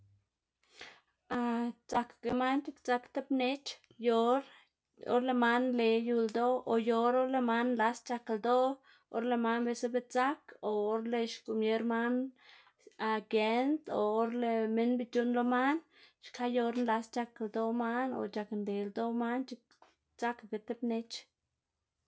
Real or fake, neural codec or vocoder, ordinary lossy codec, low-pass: real; none; none; none